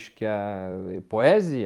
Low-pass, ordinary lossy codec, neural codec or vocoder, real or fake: 19.8 kHz; Opus, 32 kbps; vocoder, 44.1 kHz, 128 mel bands every 256 samples, BigVGAN v2; fake